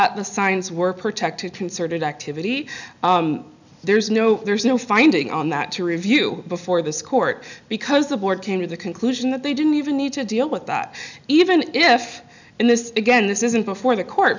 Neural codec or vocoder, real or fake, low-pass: none; real; 7.2 kHz